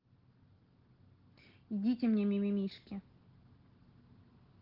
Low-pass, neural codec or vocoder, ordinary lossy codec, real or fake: 5.4 kHz; none; Opus, 16 kbps; real